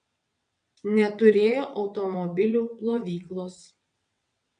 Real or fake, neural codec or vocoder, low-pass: fake; vocoder, 22.05 kHz, 80 mel bands, WaveNeXt; 9.9 kHz